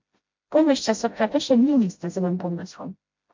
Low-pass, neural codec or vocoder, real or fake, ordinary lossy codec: 7.2 kHz; codec, 16 kHz, 0.5 kbps, FreqCodec, smaller model; fake; MP3, 48 kbps